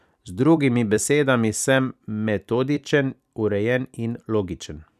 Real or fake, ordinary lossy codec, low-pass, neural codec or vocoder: fake; none; 14.4 kHz; vocoder, 44.1 kHz, 128 mel bands every 256 samples, BigVGAN v2